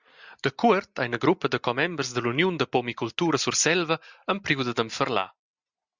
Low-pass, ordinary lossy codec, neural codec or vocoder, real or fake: 7.2 kHz; Opus, 64 kbps; none; real